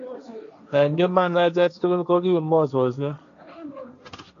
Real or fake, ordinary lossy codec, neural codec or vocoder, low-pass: fake; none; codec, 16 kHz, 1.1 kbps, Voila-Tokenizer; 7.2 kHz